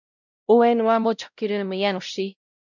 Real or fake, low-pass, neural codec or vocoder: fake; 7.2 kHz; codec, 16 kHz, 0.5 kbps, X-Codec, WavLM features, trained on Multilingual LibriSpeech